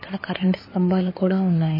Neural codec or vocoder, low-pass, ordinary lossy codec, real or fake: codec, 16 kHz in and 24 kHz out, 2.2 kbps, FireRedTTS-2 codec; 5.4 kHz; MP3, 24 kbps; fake